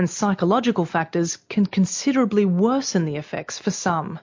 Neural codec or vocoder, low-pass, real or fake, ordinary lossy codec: none; 7.2 kHz; real; MP3, 48 kbps